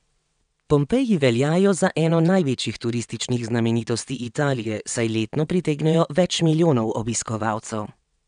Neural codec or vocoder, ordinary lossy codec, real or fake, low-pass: vocoder, 22.05 kHz, 80 mel bands, WaveNeXt; none; fake; 9.9 kHz